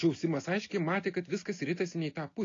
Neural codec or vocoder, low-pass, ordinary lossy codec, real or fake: none; 7.2 kHz; AAC, 32 kbps; real